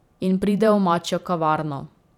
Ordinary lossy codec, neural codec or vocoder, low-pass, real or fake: none; vocoder, 44.1 kHz, 128 mel bands every 512 samples, BigVGAN v2; 19.8 kHz; fake